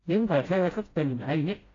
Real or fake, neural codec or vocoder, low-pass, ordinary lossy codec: fake; codec, 16 kHz, 0.5 kbps, FreqCodec, smaller model; 7.2 kHz; AAC, 32 kbps